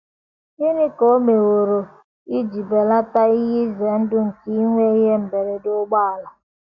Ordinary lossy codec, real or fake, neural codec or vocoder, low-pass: Opus, 64 kbps; real; none; 7.2 kHz